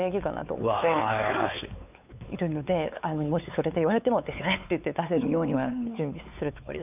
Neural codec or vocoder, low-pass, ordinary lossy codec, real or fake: codec, 16 kHz, 4 kbps, X-Codec, WavLM features, trained on Multilingual LibriSpeech; 3.6 kHz; none; fake